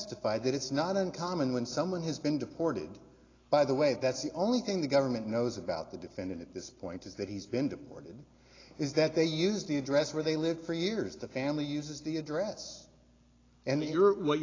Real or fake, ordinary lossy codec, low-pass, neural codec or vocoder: real; AAC, 32 kbps; 7.2 kHz; none